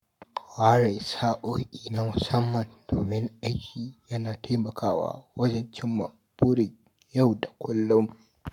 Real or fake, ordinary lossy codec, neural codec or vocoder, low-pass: fake; none; codec, 44.1 kHz, 7.8 kbps, Pupu-Codec; 19.8 kHz